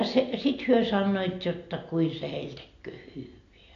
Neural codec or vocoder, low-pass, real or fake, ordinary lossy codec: none; 7.2 kHz; real; AAC, 48 kbps